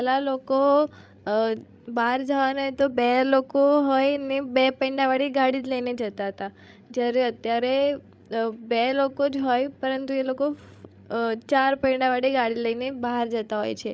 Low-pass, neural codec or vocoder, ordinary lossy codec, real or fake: none; codec, 16 kHz, 16 kbps, FreqCodec, larger model; none; fake